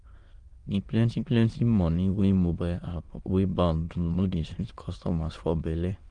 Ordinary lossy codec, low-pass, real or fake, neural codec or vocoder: Opus, 24 kbps; 9.9 kHz; fake; autoencoder, 22.05 kHz, a latent of 192 numbers a frame, VITS, trained on many speakers